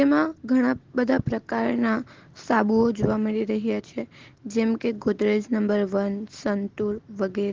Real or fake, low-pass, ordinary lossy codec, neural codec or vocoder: real; 7.2 kHz; Opus, 16 kbps; none